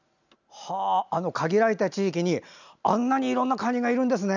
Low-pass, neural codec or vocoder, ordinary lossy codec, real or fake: 7.2 kHz; none; none; real